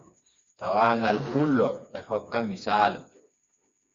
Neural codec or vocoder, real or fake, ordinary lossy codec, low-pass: codec, 16 kHz, 2 kbps, FreqCodec, smaller model; fake; Opus, 64 kbps; 7.2 kHz